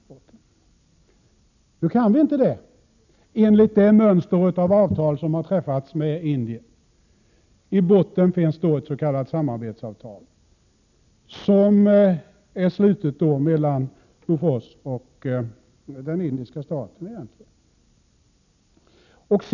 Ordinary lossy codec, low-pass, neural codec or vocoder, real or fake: none; 7.2 kHz; none; real